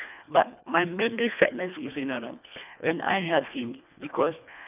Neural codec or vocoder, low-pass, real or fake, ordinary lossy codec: codec, 24 kHz, 1.5 kbps, HILCodec; 3.6 kHz; fake; none